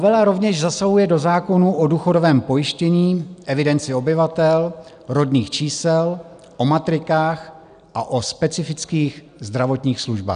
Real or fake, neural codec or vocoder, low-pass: real; none; 9.9 kHz